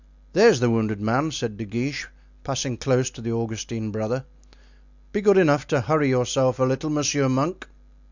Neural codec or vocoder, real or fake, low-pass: none; real; 7.2 kHz